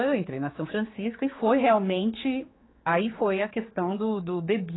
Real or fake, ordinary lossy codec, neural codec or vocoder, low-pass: fake; AAC, 16 kbps; codec, 16 kHz, 4 kbps, X-Codec, HuBERT features, trained on general audio; 7.2 kHz